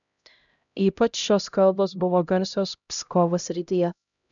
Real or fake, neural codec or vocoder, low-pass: fake; codec, 16 kHz, 0.5 kbps, X-Codec, HuBERT features, trained on LibriSpeech; 7.2 kHz